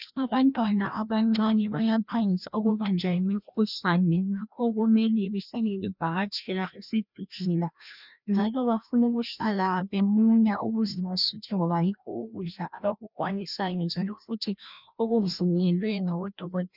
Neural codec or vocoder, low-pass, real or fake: codec, 16 kHz, 1 kbps, FreqCodec, larger model; 5.4 kHz; fake